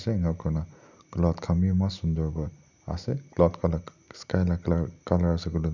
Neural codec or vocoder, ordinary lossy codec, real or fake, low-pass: none; none; real; 7.2 kHz